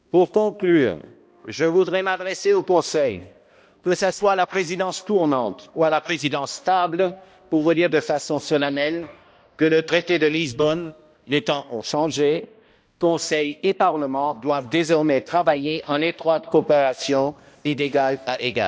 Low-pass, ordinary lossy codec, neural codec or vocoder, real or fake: none; none; codec, 16 kHz, 1 kbps, X-Codec, HuBERT features, trained on balanced general audio; fake